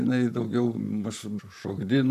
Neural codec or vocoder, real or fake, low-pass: vocoder, 44.1 kHz, 128 mel bands, Pupu-Vocoder; fake; 14.4 kHz